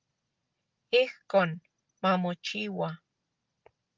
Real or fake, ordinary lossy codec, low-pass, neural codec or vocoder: real; Opus, 24 kbps; 7.2 kHz; none